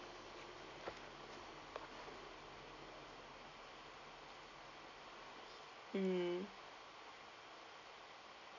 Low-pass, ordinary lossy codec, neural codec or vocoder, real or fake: 7.2 kHz; none; none; real